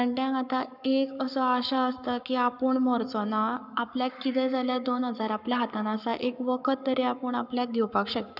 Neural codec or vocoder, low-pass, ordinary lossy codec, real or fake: codec, 44.1 kHz, 7.8 kbps, Pupu-Codec; 5.4 kHz; none; fake